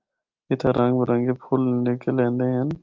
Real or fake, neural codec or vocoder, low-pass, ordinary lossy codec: real; none; 7.2 kHz; Opus, 24 kbps